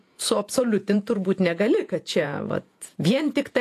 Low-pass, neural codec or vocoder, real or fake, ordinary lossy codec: 14.4 kHz; vocoder, 48 kHz, 128 mel bands, Vocos; fake; AAC, 64 kbps